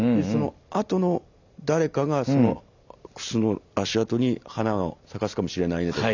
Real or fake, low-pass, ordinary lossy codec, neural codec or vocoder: real; 7.2 kHz; none; none